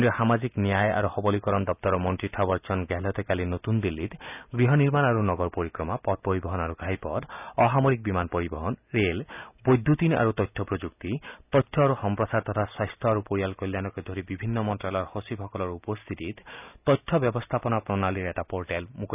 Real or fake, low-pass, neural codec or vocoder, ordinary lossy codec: real; 3.6 kHz; none; none